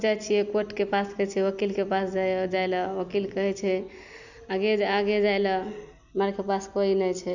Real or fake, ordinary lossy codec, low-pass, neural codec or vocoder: real; none; 7.2 kHz; none